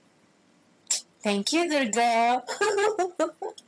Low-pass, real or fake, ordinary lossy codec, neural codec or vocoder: none; fake; none; vocoder, 22.05 kHz, 80 mel bands, HiFi-GAN